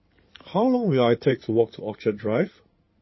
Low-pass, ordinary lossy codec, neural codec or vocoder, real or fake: 7.2 kHz; MP3, 24 kbps; codec, 16 kHz in and 24 kHz out, 2.2 kbps, FireRedTTS-2 codec; fake